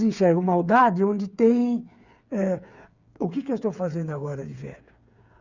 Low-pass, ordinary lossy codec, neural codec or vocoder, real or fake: 7.2 kHz; Opus, 64 kbps; codec, 16 kHz, 8 kbps, FreqCodec, smaller model; fake